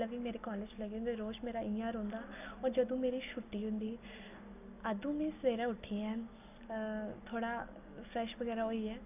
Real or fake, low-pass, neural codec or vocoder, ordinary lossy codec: real; 3.6 kHz; none; none